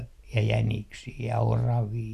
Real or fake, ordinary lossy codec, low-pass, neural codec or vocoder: real; none; 14.4 kHz; none